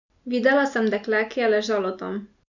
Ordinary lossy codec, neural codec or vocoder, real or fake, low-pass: none; none; real; 7.2 kHz